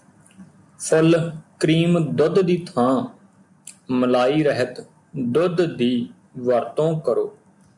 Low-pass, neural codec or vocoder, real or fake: 10.8 kHz; none; real